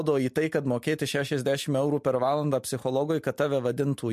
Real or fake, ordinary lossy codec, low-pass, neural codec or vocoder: real; MP3, 64 kbps; 14.4 kHz; none